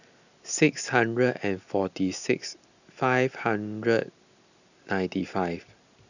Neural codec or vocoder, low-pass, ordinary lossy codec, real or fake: none; 7.2 kHz; none; real